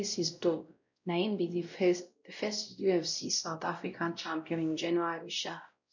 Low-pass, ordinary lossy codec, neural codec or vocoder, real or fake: 7.2 kHz; none; codec, 16 kHz, 0.5 kbps, X-Codec, WavLM features, trained on Multilingual LibriSpeech; fake